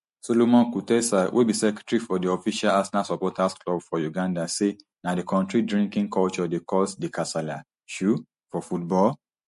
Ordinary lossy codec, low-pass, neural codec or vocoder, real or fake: MP3, 48 kbps; 14.4 kHz; none; real